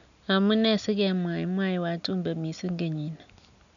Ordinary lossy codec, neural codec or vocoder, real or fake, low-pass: none; none; real; 7.2 kHz